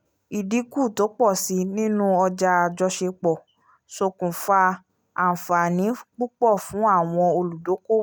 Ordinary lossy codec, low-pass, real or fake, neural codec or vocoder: none; none; real; none